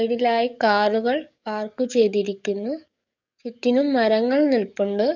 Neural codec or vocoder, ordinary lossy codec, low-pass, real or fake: codec, 44.1 kHz, 7.8 kbps, DAC; none; 7.2 kHz; fake